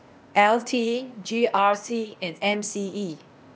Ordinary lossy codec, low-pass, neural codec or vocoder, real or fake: none; none; codec, 16 kHz, 0.8 kbps, ZipCodec; fake